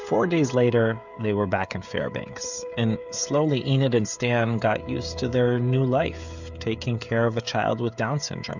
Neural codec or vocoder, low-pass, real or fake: codec, 16 kHz, 16 kbps, FreqCodec, smaller model; 7.2 kHz; fake